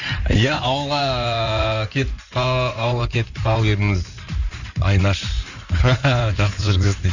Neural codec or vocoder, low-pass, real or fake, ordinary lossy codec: codec, 16 kHz in and 24 kHz out, 2.2 kbps, FireRedTTS-2 codec; 7.2 kHz; fake; none